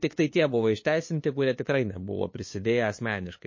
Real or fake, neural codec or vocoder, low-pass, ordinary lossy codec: fake; codec, 16 kHz, 2 kbps, FunCodec, trained on LibriTTS, 25 frames a second; 7.2 kHz; MP3, 32 kbps